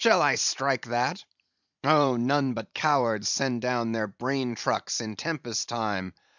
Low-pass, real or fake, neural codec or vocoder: 7.2 kHz; fake; vocoder, 44.1 kHz, 128 mel bands every 512 samples, BigVGAN v2